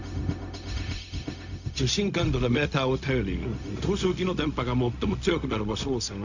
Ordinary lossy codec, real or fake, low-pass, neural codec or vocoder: none; fake; 7.2 kHz; codec, 16 kHz, 0.4 kbps, LongCat-Audio-Codec